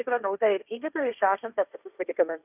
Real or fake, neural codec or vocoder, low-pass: fake; codec, 16 kHz, 1.1 kbps, Voila-Tokenizer; 3.6 kHz